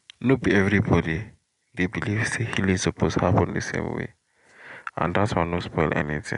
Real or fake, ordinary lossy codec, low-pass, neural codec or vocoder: real; MP3, 64 kbps; 10.8 kHz; none